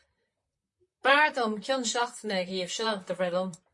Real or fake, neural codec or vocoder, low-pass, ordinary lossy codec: fake; vocoder, 44.1 kHz, 128 mel bands, Pupu-Vocoder; 10.8 kHz; MP3, 48 kbps